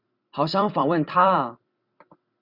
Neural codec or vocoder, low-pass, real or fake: vocoder, 44.1 kHz, 128 mel bands every 256 samples, BigVGAN v2; 5.4 kHz; fake